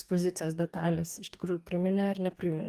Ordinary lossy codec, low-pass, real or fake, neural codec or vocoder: Opus, 32 kbps; 14.4 kHz; fake; codec, 44.1 kHz, 2.6 kbps, DAC